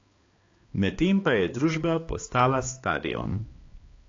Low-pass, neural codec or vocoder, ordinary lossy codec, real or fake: 7.2 kHz; codec, 16 kHz, 2 kbps, X-Codec, HuBERT features, trained on balanced general audio; AAC, 32 kbps; fake